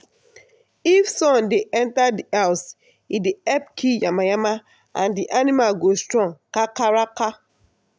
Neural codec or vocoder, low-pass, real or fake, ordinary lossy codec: none; none; real; none